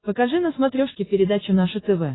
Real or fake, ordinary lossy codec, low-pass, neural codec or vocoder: real; AAC, 16 kbps; 7.2 kHz; none